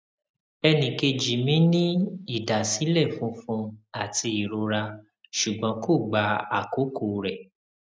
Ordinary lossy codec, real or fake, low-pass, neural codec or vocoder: none; real; none; none